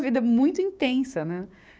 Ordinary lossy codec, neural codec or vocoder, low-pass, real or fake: none; codec, 16 kHz, 6 kbps, DAC; none; fake